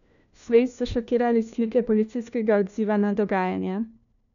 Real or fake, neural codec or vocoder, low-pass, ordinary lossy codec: fake; codec, 16 kHz, 1 kbps, FunCodec, trained on LibriTTS, 50 frames a second; 7.2 kHz; none